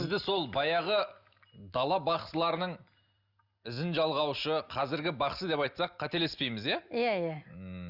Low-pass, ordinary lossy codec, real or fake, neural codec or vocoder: 5.4 kHz; Opus, 64 kbps; real; none